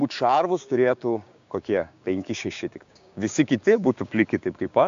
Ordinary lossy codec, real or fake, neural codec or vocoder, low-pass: AAC, 64 kbps; fake; codec, 16 kHz, 6 kbps, DAC; 7.2 kHz